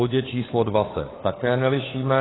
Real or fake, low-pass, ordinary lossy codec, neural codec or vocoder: fake; 7.2 kHz; AAC, 16 kbps; codec, 16 kHz, 4 kbps, FunCodec, trained on LibriTTS, 50 frames a second